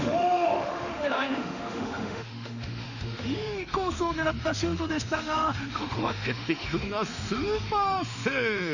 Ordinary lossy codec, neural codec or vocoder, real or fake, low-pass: none; autoencoder, 48 kHz, 32 numbers a frame, DAC-VAE, trained on Japanese speech; fake; 7.2 kHz